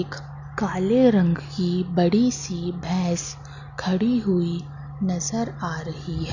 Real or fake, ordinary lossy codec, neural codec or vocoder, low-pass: real; none; none; 7.2 kHz